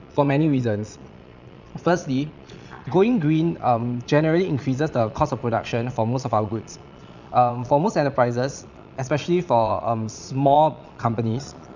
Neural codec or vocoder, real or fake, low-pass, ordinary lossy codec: vocoder, 22.05 kHz, 80 mel bands, Vocos; fake; 7.2 kHz; none